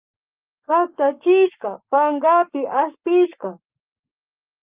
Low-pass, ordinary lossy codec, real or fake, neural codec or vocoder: 3.6 kHz; Opus, 24 kbps; fake; codec, 44.1 kHz, 7.8 kbps, Pupu-Codec